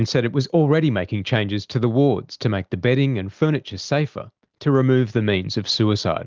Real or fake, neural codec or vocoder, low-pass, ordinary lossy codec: real; none; 7.2 kHz; Opus, 32 kbps